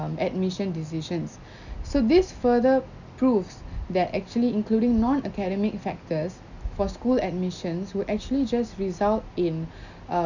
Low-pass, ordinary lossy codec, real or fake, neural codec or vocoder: 7.2 kHz; none; real; none